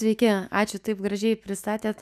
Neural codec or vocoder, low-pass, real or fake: autoencoder, 48 kHz, 128 numbers a frame, DAC-VAE, trained on Japanese speech; 14.4 kHz; fake